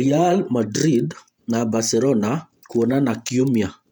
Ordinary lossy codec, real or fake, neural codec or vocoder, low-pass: none; fake; vocoder, 48 kHz, 128 mel bands, Vocos; 19.8 kHz